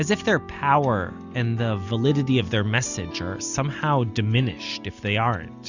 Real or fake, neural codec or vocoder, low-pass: real; none; 7.2 kHz